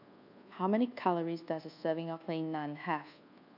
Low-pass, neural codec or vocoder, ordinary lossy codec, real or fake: 5.4 kHz; codec, 24 kHz, 1.2 kbps, DualCodec; none; fake